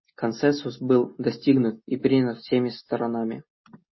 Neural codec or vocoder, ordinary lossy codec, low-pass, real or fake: none; MP3, 24 kbps; 7.2 kHz; real